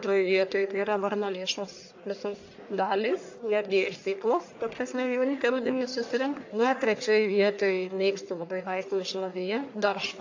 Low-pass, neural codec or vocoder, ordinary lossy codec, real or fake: 7.2 kHz; codec, 44.1 kHz, 1.7 kbps, Pupu-Codec; AAC, 48 kbps; fake